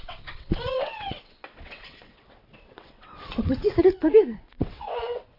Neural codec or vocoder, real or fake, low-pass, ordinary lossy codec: vocoder, 22.05 kHz, 80 mel bands, WaveNeXt; fake; 5.4 kHz; AAC, 32 kbps